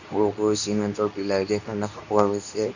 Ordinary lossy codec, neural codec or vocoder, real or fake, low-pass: none; codec, 24 kHz, 0.9 kbps, WavTokenizer, medium speech release version 2; fake; 7.2 kHz